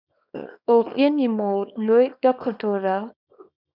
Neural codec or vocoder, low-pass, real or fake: codec, 24 kHz, 0.9 kbps, WavTokenizer, small release; 5.4 kHz; fake